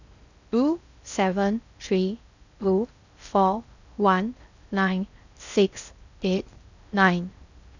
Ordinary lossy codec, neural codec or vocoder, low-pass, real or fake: none; codec, 16 kHz in and 24 kHz out, 0.6 kbps, FocalCodec, streaming, 2048 codes; 7.2 kHz; fake